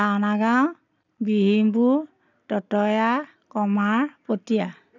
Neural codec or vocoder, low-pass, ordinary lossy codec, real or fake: none; 7.2 kHz; none; real